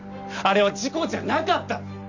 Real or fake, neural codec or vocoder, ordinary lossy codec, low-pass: real; none; none; 7.2 kHz